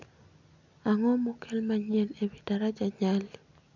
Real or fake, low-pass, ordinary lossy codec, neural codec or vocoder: real; 7.2 kHz; none; none